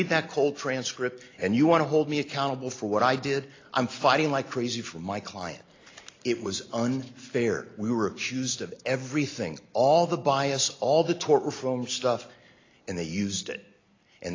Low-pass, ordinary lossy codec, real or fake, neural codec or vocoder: 7.2 kHz; AAC, 32 kbps; real; none